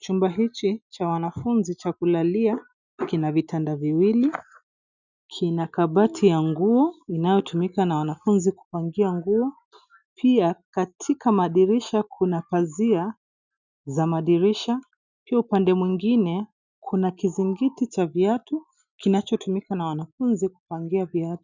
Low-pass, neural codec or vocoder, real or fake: 7.2 kHz; none; real